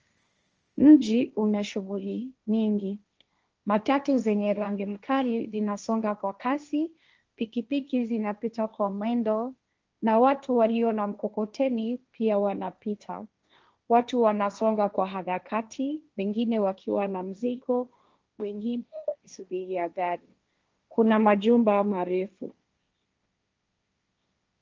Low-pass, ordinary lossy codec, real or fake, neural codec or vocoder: 7.2 kHz; Opus, 24 kbps; fake; codec, 16 kHz, 1.1 kbps, Voila-Tokenizer